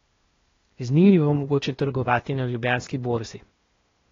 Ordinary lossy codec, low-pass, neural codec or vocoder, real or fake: AAC, 32 kbps; 7.2 kHz; codec, 16 kHz, 0.8 kbps, ZipCodec; fake